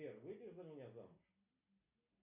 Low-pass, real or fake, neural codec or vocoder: 3.6 kHz; real; none